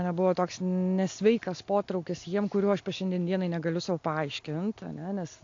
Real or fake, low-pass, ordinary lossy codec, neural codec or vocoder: real; 7.2 kHz; AAC, 48 kbps; none